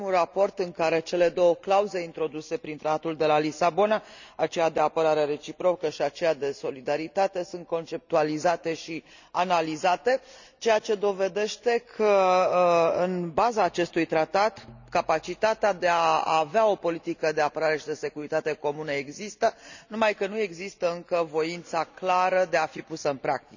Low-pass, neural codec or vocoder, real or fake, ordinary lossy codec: 7.2 kHz; none; real; none